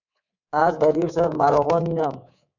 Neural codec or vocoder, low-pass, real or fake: codec, 24 kHz, 3.1 kbps, DualCodec; 7.2 kHz; fake